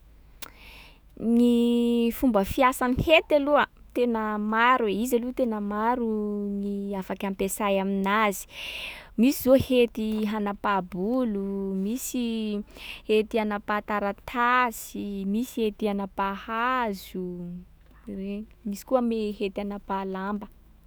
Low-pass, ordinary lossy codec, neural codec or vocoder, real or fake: none; none; autoencoder, 48 kHz, 128 numbers a frame, DAC-VAE, trained on Japanese speech; fake